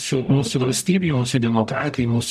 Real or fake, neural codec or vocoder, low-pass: fake; codec, 44.1 kHz, 0.9 kbps, DAC; 14.4 kHz